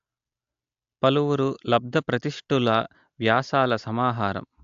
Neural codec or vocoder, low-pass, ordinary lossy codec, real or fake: none; 7.2 kHz; AAC, 64 kbps; real